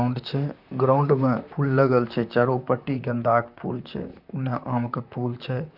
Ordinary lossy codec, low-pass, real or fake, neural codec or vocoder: none; 5.4 kHz; fake; vocoder, 44.1 kHz, 128 mel bands, Pupu-Vocoder